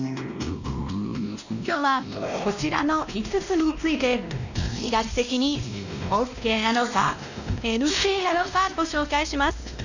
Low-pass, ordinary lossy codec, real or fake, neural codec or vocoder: 7.2 kHz; none; fake; codec, 16 kHz, 1 kbps, X-Codec, WavLM features, trained on Multilingual LibriSpeech